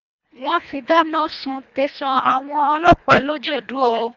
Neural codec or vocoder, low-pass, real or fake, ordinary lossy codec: codec, 24 kHz, 1.5 kbps, HILCodec; 7.2 kHz; fake; none